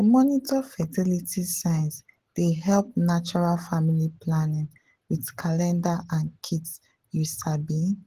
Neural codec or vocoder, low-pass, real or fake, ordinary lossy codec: none; 14.4 kHz; real; Opus, 16 kbps